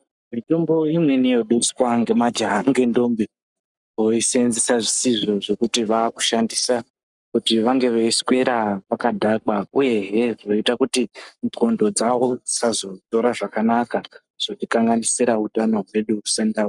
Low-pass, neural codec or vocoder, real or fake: 10.8 kHz; codec, 44.1 kHz, 7.8 kbps, Pupu-Codec; fake